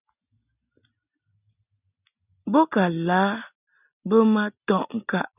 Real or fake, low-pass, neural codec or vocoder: real; 3.6 kHz; none